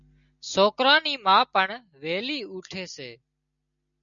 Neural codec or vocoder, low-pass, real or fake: none; 7.2 kHz; real